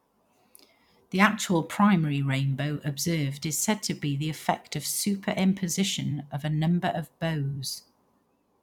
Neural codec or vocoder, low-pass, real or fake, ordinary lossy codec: vocoder, 44.1 kHz, 128 mel bands every 256 samples, BigVGAN v2; 19.8 kHz; fake; none